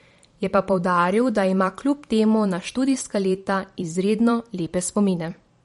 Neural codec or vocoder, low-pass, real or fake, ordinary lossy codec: vocoder, 44.1 kHz, 128 mel bands every 512 samples, BigVGAN v2; 19.8 kHz; fake; MP3, 48 kbps